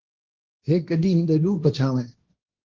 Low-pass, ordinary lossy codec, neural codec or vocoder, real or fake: 7.2 kHz; Opus, 16 kbps; codec, 16 kHz, 1.1 kbps, Voila-Tokenizer; fake